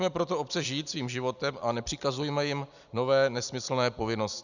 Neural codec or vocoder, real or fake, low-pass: none; real; 7.2 kHz